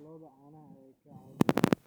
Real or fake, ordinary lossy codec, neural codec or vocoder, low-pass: real; none; none; none